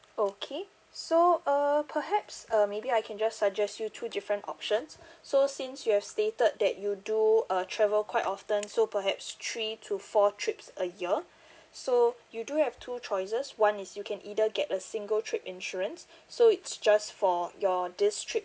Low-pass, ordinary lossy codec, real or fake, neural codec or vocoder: none; none; real; none